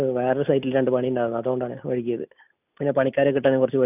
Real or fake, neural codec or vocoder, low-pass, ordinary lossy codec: real; none; 3.6 kHz; none